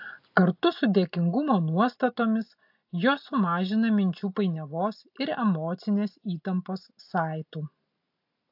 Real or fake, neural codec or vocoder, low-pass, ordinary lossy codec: real; none; 5.4 kHz; AAC, 48 kbps